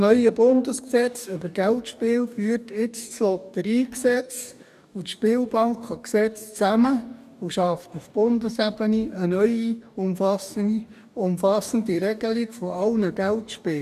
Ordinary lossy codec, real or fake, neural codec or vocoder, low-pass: none; fake; codec, 44.1 kHz, 2.6 kbps, DAC; 14.4 kHz